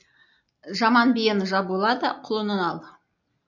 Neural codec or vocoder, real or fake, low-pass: none; real; 7.2 kHz